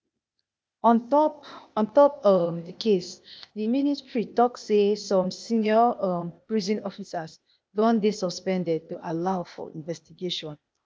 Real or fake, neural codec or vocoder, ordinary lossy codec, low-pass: fake; codec, 16 kHz, 0.8 kbps, ZipCodec; none; none